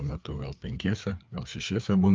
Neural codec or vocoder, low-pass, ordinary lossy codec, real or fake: codec, 16 kHz, 4 kbps, FreqCodec, larger model; 7.2 kHz; Opus, 24 kbps; fake